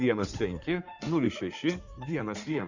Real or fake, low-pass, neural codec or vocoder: fake; 7.2 kHz; codec, 16 kHz in and 24 kHz out, 2.2 kbps, FireRedTTS-2 codec